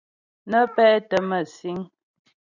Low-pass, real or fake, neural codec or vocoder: 7.2 kHz; real; none